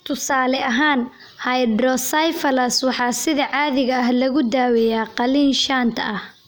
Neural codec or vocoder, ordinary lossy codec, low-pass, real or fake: none; none; none; real